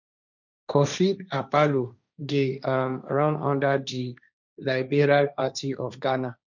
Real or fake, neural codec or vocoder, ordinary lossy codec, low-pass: fake; codec, 16 kHz, 1.1 kbps, Voila-Tokenizer; none; 7.2 kHz